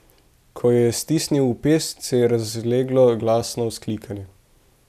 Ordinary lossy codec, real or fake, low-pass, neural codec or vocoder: none; real; 14.4 kHz; none